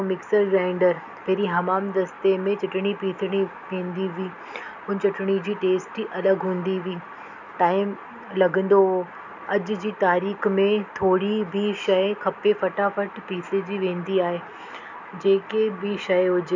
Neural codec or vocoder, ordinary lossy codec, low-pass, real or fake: none; none; 7.2 kHz; real